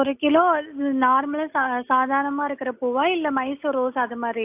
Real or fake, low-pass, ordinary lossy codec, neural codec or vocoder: real; 3.6 kHz; AAC, 32 kbps; none